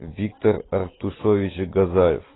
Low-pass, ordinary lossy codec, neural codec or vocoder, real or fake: 7.2 kHz; AAC, 16 kbps; none; real